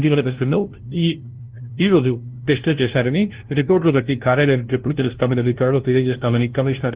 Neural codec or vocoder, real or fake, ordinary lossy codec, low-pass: codec, 16 kHz, 0.5 kbps, FunCodec, trained on LibriTTS, 25 frames a second; fake; Opus, 16 kbps; 3.6 kHz